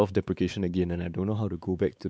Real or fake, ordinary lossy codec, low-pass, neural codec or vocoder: fake; none; none; codec, 16 kHz, 4 kbps, X-Codec, WavLM features, trained on Multilingual LibriSpeech